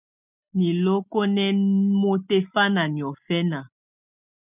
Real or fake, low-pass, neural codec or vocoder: real; 3.6 kHz; none